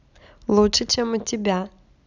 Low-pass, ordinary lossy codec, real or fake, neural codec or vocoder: 7.2 kHz; none; real; none